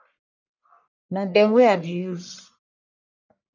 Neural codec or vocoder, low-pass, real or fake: codec, 44.1 kHz, 1.7 kbps, Pupu-Codec; 7.2 kHz; fake